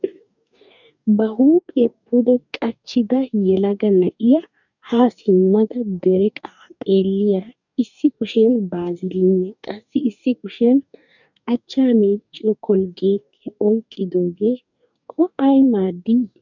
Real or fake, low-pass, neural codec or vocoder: fake; 7.2 kHz; codec, 44.1 kHz, 2.6 kbps, DAC